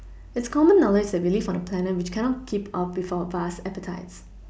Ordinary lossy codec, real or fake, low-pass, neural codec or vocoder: none; real; none; none